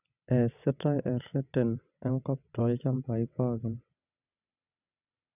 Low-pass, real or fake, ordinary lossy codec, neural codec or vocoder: 3.6 kHz; fake; none; vocoder, 22.05 kHz, 80 mel bands, WaveNeXt